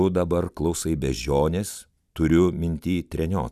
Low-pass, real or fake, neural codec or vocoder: 14.4 kHz; real; none